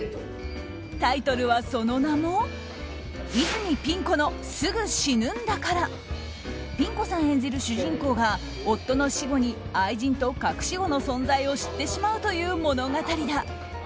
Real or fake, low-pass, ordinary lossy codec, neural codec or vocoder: real; none; none; none